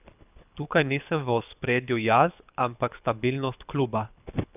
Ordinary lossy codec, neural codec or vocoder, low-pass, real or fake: none; codec, 24 kHz, 6 kbps, HILCodec; 3.6 kHz; fake